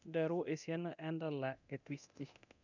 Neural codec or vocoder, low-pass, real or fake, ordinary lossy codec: codec, 24 kHz, 0.9 kbps, DualCodec; 7.2 kHz; fake; none